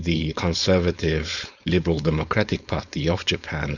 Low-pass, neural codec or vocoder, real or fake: 7.2 kHz; codec, 16 kHz, 4.8 kbps, FACodec; fake